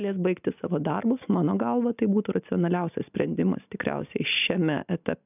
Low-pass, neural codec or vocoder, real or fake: 3.6 kHz; none; real